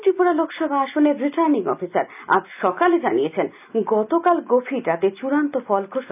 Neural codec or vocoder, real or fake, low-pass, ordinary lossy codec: none; real; 3.6 kHz; none